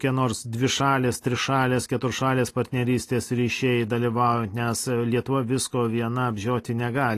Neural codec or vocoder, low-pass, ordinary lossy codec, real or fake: none; 14.4 kHz; AAC, 48 kbps; real